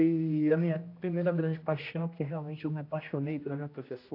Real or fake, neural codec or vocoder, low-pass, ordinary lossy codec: fake; codec, 16 kHz, 1 kbps, X-Codec, HuBERT features, trained on general audio; 5.4 kHz; AAC, 32 kbps